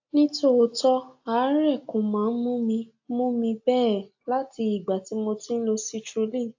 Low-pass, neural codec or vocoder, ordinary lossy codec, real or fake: 7.2 kHz; none; AAC, 48 kbps; real